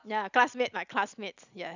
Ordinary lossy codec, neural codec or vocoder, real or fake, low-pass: none; none; real; 7.2 kHz